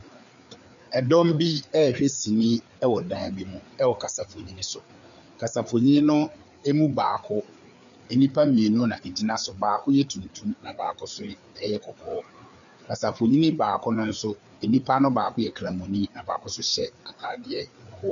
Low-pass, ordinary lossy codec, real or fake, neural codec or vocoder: 7.2 kHz; MP3, 96 kbps; fake; codec, 16 kHz, 4 kbps, FreqCodec, larger model